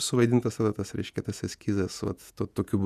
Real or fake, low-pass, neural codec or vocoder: fake; 14.4 kHz; vocoder, 48 kHz, 128 mel bands, Vocos